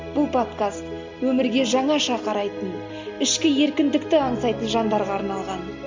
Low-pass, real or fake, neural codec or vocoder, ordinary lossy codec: 7.2 kHz; real; none; MP3, 64 kbps